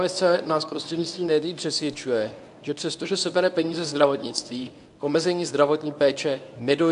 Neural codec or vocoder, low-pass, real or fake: codec, 24 kHz, 0.9 kbps, WavTokenizer, medium speech release version 1; 10.8 kHz; fake